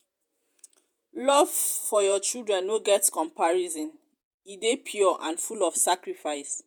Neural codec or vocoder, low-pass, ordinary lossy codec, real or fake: vocoder, 48 kHz, 128 mel bands, Vocos; none; none; fake